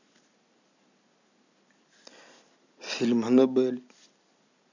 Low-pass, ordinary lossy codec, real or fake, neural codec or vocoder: 7.2 kHz; none; real; none